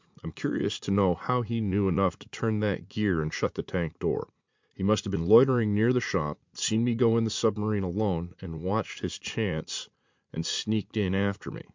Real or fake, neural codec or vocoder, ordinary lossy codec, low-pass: fake; vocoder, 44.1 kHz, 80 mel bands, Vocos; MP3, 64 kbps; 7.2 kHz